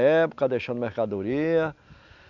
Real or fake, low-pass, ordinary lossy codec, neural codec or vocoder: real; 7.2 kHz; none; none